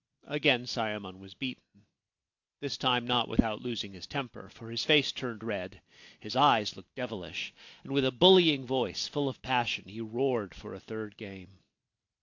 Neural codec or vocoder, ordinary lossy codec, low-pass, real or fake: none; AAC, 48 kbps; 7.2 kHz; real